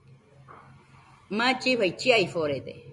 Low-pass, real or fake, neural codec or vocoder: 10.8 kHz; real; none